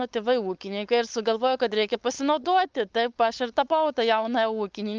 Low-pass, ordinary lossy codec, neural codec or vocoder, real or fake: 7.2 kHz; Opus, 24 kbps; codec, 16 kHz, 4.8 kbps, FACodec; fake